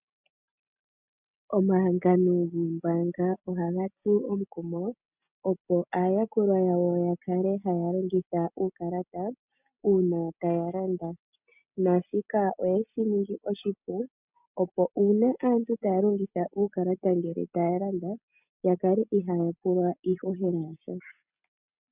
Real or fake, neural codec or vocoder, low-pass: real; none; 3.6 kHz